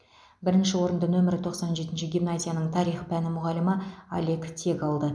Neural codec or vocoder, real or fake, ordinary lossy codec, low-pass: none; real; none; none